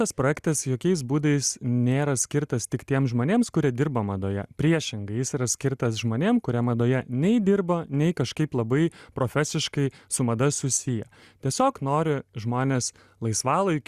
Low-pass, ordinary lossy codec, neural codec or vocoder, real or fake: 14.4 kHz; Opus, 64 kbps; none; real